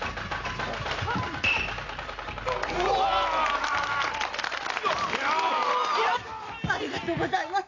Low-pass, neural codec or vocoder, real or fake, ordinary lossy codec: 7.2 kHz; none; real; AAC, 32 kbps